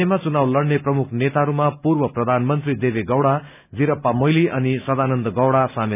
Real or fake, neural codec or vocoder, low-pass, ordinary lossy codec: real; none; 3.6 kHz; none